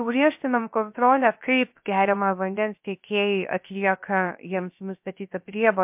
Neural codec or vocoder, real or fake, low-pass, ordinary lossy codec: codec, 16 kHz, 0.3 kbps, FocalCodec; fake; 3.6 kHz; MP3, 32 kbps